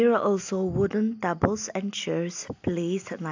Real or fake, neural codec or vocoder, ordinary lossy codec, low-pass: real; none; AAC, 48 kbps; 7.2 kHz